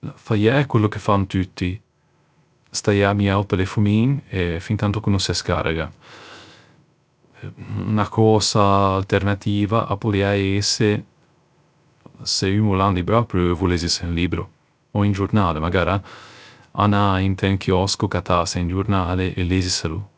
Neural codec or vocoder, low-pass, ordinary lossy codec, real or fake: codec, 16 kHz, 0.3 kbps, FocalCodec; none; none; fake